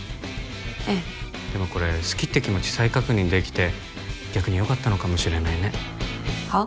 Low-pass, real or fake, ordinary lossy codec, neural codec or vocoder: none; real; none; none